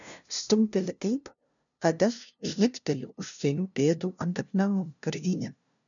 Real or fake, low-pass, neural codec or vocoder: fake; 7.2 kHz; codec, 16 kHz, 0.5 kbps, FunCodec, trained on LibriTTS, 25 frames a second